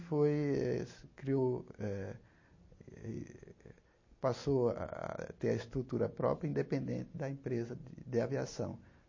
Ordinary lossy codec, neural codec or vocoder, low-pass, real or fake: MP3, 32 kbps; none; 7.2 kHz; real